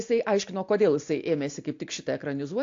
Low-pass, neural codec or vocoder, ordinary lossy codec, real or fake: 7.2 kHz; none; AAC, 48 kbps; real